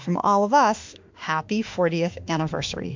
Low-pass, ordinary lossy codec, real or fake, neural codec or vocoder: 7.2 kHz; MP3, 64 kbps; fake; autoencoder, 48 kHz, 32 numbers a frame, DAC-VAE, trained on Japanese speech